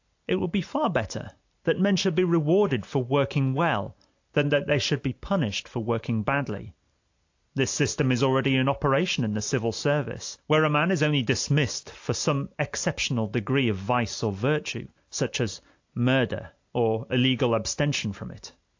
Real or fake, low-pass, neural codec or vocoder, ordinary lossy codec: real; 7.2 kHz; none; AAC, 48 kbps